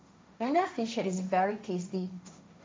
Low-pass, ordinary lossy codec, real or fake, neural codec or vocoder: none; none; fake; codec, 16 kHz, 1.1 kbps, Voila-Tokenizer